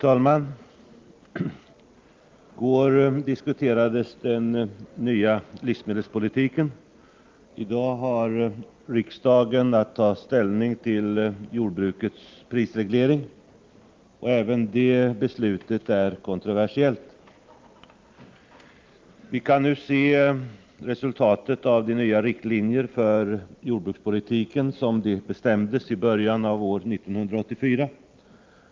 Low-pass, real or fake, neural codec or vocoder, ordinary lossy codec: 7.2 kHz; real; none; Opus, 32 kbps